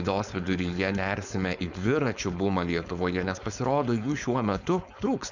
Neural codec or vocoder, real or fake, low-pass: codec, 16 kHz, 4.8 kbps, FACodec; fake; 7.2 kHz